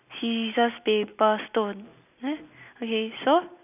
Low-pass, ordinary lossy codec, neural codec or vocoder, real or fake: 3.6 kHz; none; none; real